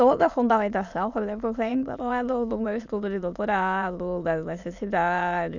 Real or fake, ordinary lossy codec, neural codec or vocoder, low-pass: fake; none; autoencoder, 22.05 kHz, a latent of 192 numbers a frame, VITS, trained on many speakers; 7.2 kHz